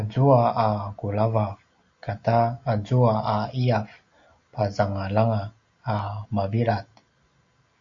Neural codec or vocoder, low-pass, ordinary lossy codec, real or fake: none; 7.2 kHz; Opus, 64 kbps; real